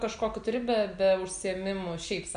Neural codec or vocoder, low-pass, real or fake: none; 9.9 kHz; real